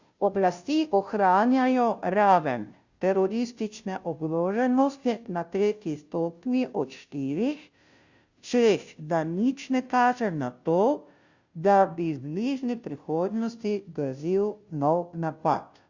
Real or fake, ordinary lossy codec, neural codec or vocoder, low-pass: fake; Opus, 64 kbps; codec, 16 kHz, 0.5 kbps, FunCodec, trained on Chinese and English, 25 frames a second; 7.2 kHz